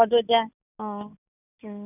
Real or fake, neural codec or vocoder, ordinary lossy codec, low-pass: real; none; none; 3.6 kHz